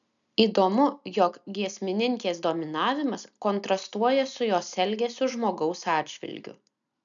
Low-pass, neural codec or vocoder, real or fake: 7.2 kHz; none; real